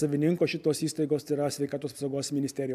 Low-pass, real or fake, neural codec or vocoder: 14.4 kHz; real; none